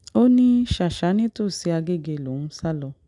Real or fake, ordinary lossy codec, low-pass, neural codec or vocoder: real; none; 10.8 kHz; none